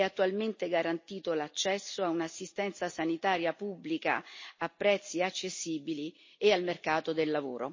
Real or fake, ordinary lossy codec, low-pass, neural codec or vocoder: real; MP3, 32 kbps; 7.2 kHz; none